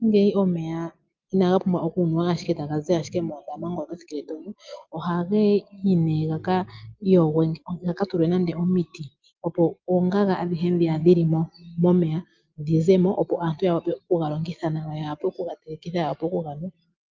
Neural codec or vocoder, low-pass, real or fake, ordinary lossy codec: none; 7.2 kHz; real; Opus, 32 kbps